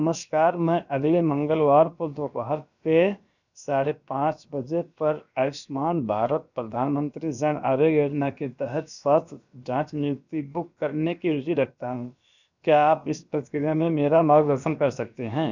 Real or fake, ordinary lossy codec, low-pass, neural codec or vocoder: fake; none; 7.2 kHz; codec, 16 kHz, about 1 kbps, DyCAST, with the encoder's durations